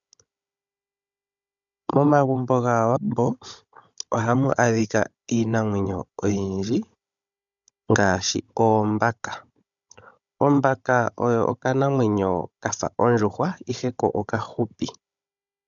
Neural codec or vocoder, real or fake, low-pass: codec, 16 kHz, 16 kbps, FunCodec, trained on Chinese and English, 50 frames a second; fake; 7.2 kHz